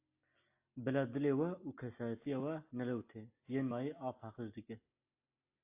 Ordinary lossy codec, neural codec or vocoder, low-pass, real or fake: AAC, 24 kbps; none; 3.6 kHz; real